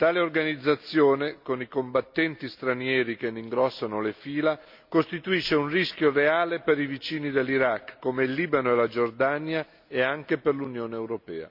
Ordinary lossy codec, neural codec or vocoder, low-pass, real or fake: MP3, 48 kbps; none; 5.4 kHz; real